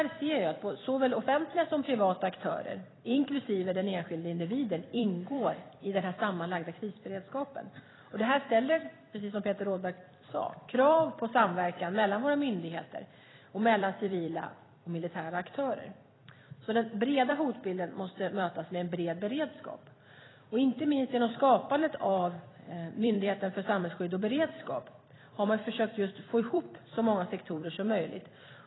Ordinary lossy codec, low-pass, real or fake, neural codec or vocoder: AAC, 16 kbps; 7.2 kHz; fake; vocoder, 44.1 kHz, 128 mel bands every 256 samples, BigVGAN v2